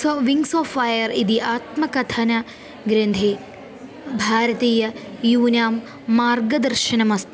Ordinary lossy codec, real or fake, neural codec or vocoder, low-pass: none; real; none; none